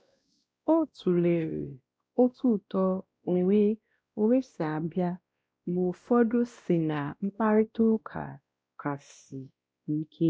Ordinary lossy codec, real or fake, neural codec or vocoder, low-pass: none; fake; codec, 16 kHz, 1 kbps, X-Codec, WavLM features, trained on Multilingual LibriSpeech; none